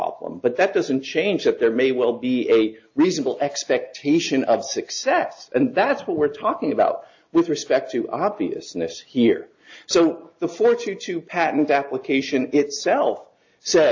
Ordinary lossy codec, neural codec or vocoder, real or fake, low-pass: AAC, 48 kbps; none; real; 7.2 kHz